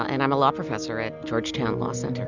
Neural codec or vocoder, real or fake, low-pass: none; real; 7.2 kHz